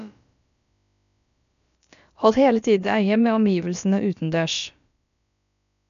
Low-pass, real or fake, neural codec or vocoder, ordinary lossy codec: 7.2 kHz; fake; codec, 16 kHz, about 1 kbps, DyCAST, with the encoder's durations; none